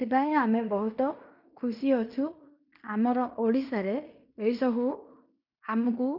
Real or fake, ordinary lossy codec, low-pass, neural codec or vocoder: fake; none; 5.4 kHz; codec, 16 kHz in and 24 kHz out, 0.9 kbps, LongCat-Audio-Codec, fine tuned four codebook decoder